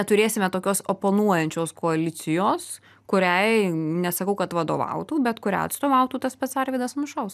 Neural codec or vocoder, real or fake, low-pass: none; real; 14.4 kHz